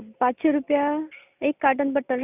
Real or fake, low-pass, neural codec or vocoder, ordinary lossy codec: real; 3.6 kHz; none; none